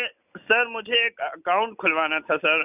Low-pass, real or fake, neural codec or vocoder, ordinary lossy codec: 3.6 kHz; real; none; none